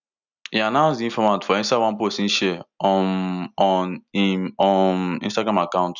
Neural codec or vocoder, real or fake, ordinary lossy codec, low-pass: none; real; none; 7.2 kHz